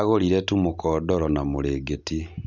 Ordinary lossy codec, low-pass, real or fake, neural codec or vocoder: none; 7.2 kHz; real; none